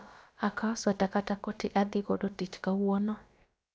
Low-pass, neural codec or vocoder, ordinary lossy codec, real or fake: none; codec, 16 kHz, about 1 kbps, DyCAST, with the encoder's durations; none; fake